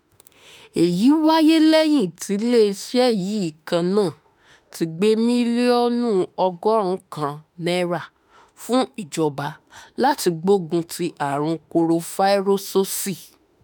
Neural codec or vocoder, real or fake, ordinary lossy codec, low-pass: autoencoder, 48 kHz, 32 numbers a frame, DAC-VAE, trained on Japanese speech; fake; none; none